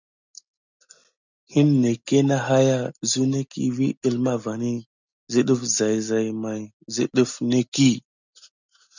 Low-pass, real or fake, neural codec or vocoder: 7.2 kHz; real; none